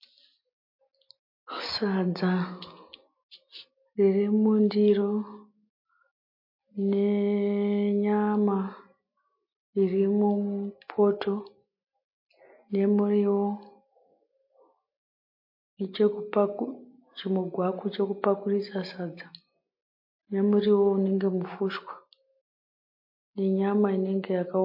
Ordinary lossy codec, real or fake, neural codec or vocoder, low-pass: MP3, 32 kbps; real; none; 5.4 kHz